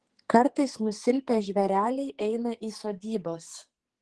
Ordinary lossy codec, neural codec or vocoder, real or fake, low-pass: Opus, 16 kbps; codec, 44.1 kHz, 2.6 kbps, SNAC; fake; 10.8 kHz